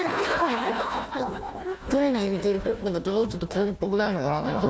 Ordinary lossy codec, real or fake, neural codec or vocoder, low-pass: none; fake; codec, 16 kHz, 1 kbps, FunCodec, trained on Chinese and English, 50 frames a second; none